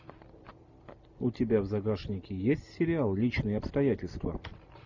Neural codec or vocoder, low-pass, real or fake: none; 7.2 kHz; real